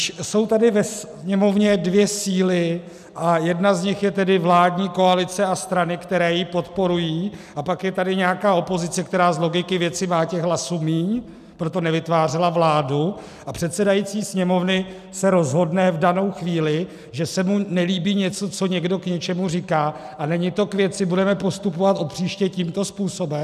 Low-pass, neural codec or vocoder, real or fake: 14.4 kHz; none; real